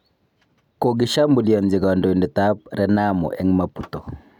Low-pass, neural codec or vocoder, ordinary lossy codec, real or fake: 19.8 kHz; none; none; real